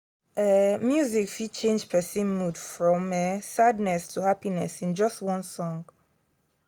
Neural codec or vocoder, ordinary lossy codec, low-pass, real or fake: none; none; none; real